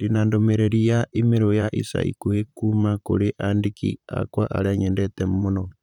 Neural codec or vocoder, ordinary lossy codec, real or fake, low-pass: vocoder, 44.1 kHz, 128 mel bands, Pupu-Vocoder; none; fake; 19.8 kHz